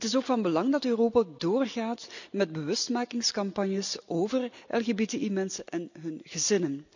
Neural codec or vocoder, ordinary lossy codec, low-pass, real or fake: none; none; 7.2 kHz; real